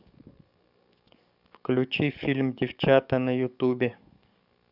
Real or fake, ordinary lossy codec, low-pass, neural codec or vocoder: fake; none; 5.4 kHz; codec, 44.1 kHz, 7.8 kbps, DAC